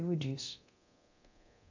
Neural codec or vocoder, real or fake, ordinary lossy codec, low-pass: codec, 24 kHz, 0.9 kbps, DualCodec; fake; AAC, 48 kbps; 7.2 kHz